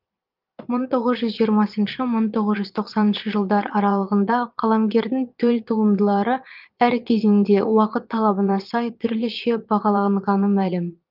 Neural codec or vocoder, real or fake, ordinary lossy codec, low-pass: vocoder, 22.05 kHz, 80 mel bands, Vocos; fake; Opus, 32 kbps; 5.4 kHz